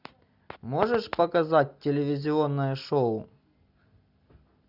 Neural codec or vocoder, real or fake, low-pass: none; real; 5.4 kHz